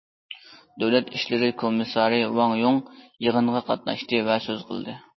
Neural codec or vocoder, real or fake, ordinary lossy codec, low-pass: none; real; MP3, 24 kbps; 7.2 kHz